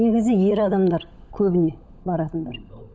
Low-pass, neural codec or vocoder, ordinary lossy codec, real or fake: none; codec, 16 kHz, 16 kbps, FunCodec, trained on LibriTTS, 50 frames a second; none; fake